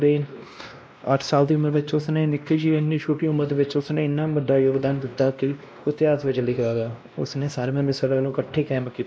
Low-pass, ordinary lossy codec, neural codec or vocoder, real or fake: none; none; codec, 16 kHz, 1 kbps, X-Codec, WavLM features, trained on Multilingual LibriSpeech; fake